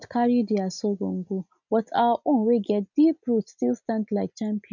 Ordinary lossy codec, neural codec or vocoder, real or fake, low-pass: none; none; real; 7.2 kHz